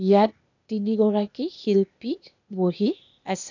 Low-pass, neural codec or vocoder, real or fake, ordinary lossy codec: 7.2 kHz; codec, 16 kHz, 0.8 kbps, ZipCodec; fake; none